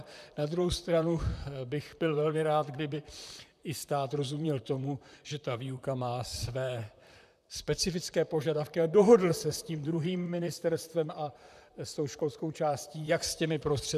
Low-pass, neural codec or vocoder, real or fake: 14.4 kHz; vocoder, 44.1 kHz, 128 mel bands, Pupu-Vocoder; fake